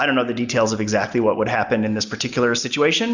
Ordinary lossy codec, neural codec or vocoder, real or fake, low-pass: Opus, 64 kbps; none; real; 7.2 kHz